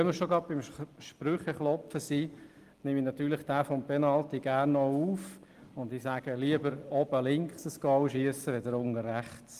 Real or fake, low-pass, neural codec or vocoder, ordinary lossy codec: real; 14.4 kHz; none; Opus, 24 kbps